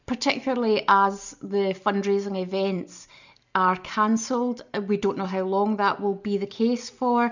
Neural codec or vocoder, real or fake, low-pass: none; real; 7.2 kHz